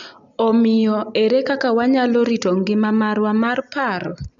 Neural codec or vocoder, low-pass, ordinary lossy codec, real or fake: none; 7.2 kHz; MP3, 96 kbps; real